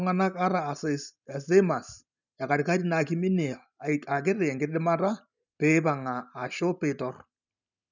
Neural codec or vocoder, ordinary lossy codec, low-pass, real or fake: none; none; 7.2 kHz; real